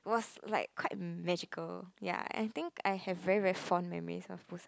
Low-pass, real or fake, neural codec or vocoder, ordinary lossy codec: none; real; none; none